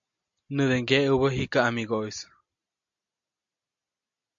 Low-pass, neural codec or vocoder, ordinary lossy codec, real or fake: 7.2 kHz; none; AAC, 64 kbps; real